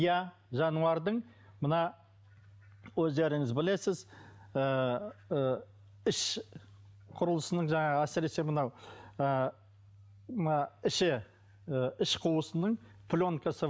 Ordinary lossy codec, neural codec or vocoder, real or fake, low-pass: none; none; real; none